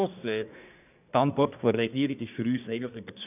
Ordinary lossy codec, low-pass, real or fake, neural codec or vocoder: none; 3.6 kHz; fake; codec, 24 kHz, 1 kbps, SNAC